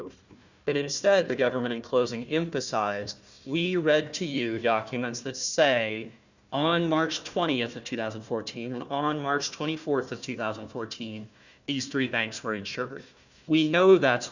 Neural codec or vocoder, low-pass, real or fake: codec, 16 kHz, 1 kbps, FunCodec, trained on Chinese and English, 50 frames a second; 7.2 kHz; fake